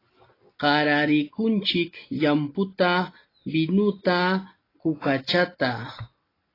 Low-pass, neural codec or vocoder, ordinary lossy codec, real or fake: 5.4 kHz; none; AAC, 24 kbps; real